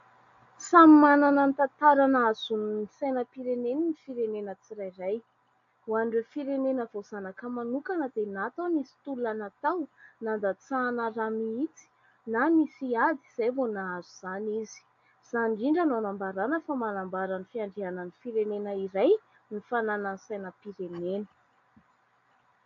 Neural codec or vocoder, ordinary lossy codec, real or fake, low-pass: none; AAC, 64 kbps; real; 7.2 kHz